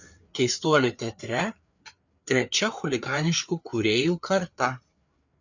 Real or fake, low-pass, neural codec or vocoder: fake; 7.2 kHz; codec, 16 kHz, 4 kbps, FreqCodec, larger model